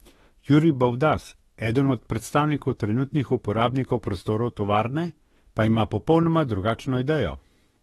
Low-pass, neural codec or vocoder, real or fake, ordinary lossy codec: 19.8 kHz; autoencoder, 48 kHz, 32 numbers a frame, DAC-VAE, trained on Japanese speech; fake; AAC, 32 kbps